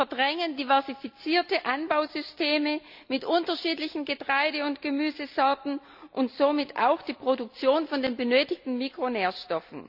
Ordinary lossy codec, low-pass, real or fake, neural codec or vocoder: none; 5.4 kHz; real; none